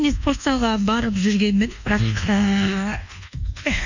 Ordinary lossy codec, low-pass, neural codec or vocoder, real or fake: none; 7.2 kHz; codec, 24 kHz, 1.2 kbps, DualCodec; fake